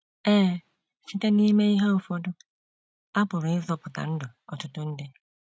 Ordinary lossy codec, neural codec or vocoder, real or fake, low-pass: none; none; real; none